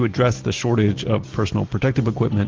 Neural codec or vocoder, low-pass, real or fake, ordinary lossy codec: none; 7.2 kHz; real; Opus, 16 kbps